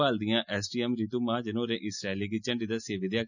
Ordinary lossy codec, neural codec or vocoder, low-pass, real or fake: none; none; 7.2 kHz; real